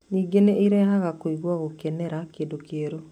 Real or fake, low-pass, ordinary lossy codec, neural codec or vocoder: real; 19.8 kHz; none; none